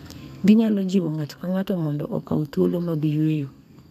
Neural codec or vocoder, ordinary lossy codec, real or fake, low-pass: codec, 32 kHz, 1.9 kbps, SNAC; none; fake; 14.4 kHz